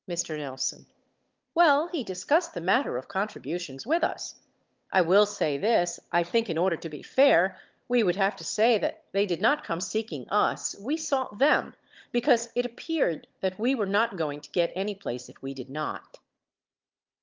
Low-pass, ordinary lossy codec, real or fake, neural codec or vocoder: 7.2 kHz; Opus, 32 kbps; fake; codec, 16 kHz, 16 kbps, FunCodec, trained on Chinese and English, 50 frames a second